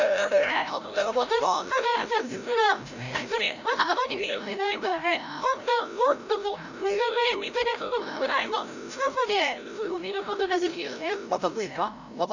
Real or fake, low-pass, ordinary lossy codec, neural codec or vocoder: fake; 7.2 kHz; none; codec, 16 kHz, 0.5 kbps, FreqCodec, larger model